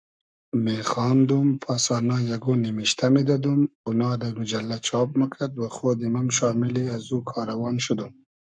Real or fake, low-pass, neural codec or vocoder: fake; 9.9 kHz; codec, 44.1 kHz, 7.8 kbps, Pupu-Codec